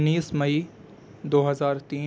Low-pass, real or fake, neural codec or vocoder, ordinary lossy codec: none; real; none; none